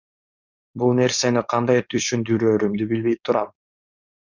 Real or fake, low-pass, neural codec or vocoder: fake; 7.2 kHz; codec, 16 kHz, 4.8 kbps, FACodec